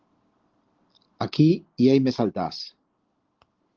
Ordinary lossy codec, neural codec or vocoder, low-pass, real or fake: Opus, 16 kbps; none; 7.2 kHz; real